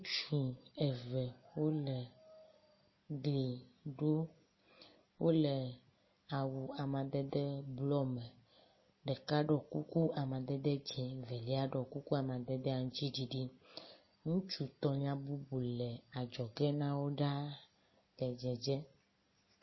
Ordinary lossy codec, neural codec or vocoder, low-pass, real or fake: MP3, 24 kbps; none; 7.2 kHz; real